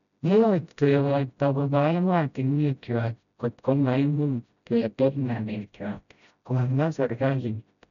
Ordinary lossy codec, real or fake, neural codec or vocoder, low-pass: none; fake; codec, 16 kHz, 0.5 kbps, FreqCodec, smaller model; 7.2 kHz